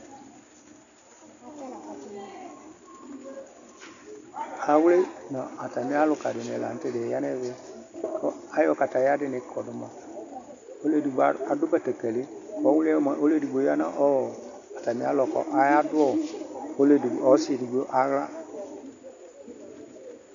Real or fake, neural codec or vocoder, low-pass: real; none; 7.2 kHz